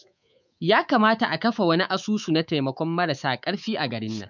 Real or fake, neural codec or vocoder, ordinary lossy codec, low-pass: fake; codec, 24 kHz, 3.1 kbps, DualCodec; none; 7.2 kHz